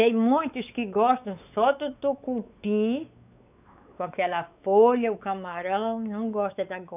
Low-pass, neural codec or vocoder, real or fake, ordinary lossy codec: 3.6 kHz; codec, 16 kHz, 4 kbps, X-Codec, WavLM features, trained on Multilingual LibriSpeech; fake; none